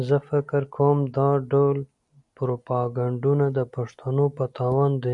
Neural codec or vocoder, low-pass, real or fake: none; 10.8 kHz; real